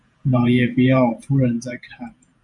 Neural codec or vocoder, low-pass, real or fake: vocoder, 48 kHz, 128 mel bands, Vocos; 10.8 kHz; fake